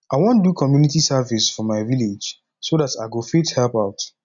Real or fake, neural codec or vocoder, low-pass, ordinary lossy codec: real; none; 7.2 kHz; none